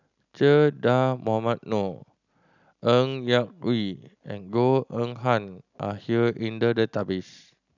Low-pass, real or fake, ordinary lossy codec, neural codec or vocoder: 7.2 kHz; real; none; none